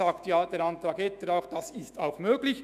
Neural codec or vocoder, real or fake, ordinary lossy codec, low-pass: autoencoder, 48 kHz, 128 numbers a frame, DAC-VAE, trained on Japanese speech; fake; none; 14.4 kHz